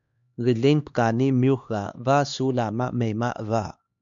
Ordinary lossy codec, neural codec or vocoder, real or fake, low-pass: MP3, 64 kbps; codec, 16 kHz, 2 kbps, X-Codec, HuBERT features, trained on LibriSpeech; fake; 7.2 kHz